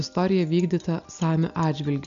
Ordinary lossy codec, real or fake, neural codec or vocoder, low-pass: AAC, 96 kbps; real; none; 7.2 kHz